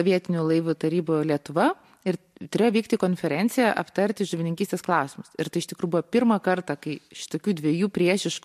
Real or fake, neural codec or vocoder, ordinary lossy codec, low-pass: real; none; MP3, 64 kbps; 14.4 kHz